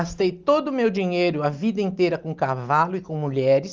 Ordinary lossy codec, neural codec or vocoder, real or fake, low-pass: Opus, 24 kbps; none; real; 7.2 kHz